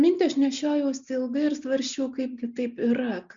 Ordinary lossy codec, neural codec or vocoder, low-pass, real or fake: Opus, 64 kbps; none; 7.2 kHz; real